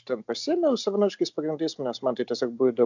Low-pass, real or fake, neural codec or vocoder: 7.2 kHz; real; none